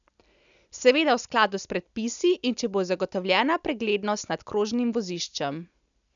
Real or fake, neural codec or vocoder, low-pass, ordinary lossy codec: real; none; 7.2 kHz; none